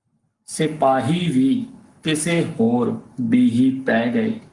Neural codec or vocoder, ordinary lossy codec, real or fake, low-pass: codec, 44.1 kHz, 7.8 kbps, Pupu-Codec; Opus, 32 kbps; fake; 10.8 kHz